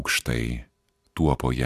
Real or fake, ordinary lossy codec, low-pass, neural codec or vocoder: fake; Opus, 64 kbps; 14.4 kHz; vocoder, 44.1 kHz, 128 mel bands every 512 samples, BigVGAN v2